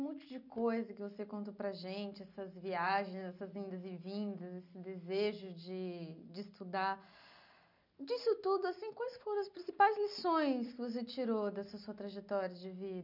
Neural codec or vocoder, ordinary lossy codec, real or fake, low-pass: none; none; real; 5.4 kHz